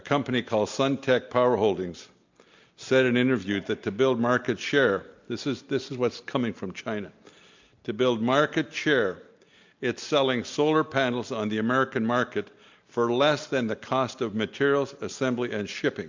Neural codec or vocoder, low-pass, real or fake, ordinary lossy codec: none; 7.2 kHz; real; MP3, 64 kbps